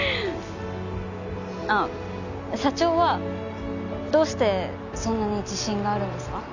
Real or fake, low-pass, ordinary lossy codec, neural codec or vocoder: real; 7.2 kHz; none; none